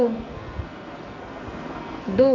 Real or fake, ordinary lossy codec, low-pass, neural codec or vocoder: fake; none; 7.2 kHz; codec, 44.1 kHz, 2.6 kbps, SNAC